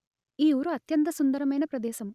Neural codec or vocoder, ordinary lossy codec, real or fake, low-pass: none; Opus, 64 kbps; real; 14.4 kHz